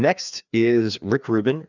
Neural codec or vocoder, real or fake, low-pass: codec, 16 kHz, 2 kbps, FreqCodec, larger model; fake; 7.2 kHz